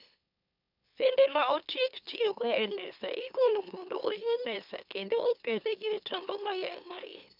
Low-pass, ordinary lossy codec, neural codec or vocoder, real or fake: 5.4 kHz; none; autoencoder, 44.1 kHz, a latent of 192 numbers a frame, MeloTTS; fake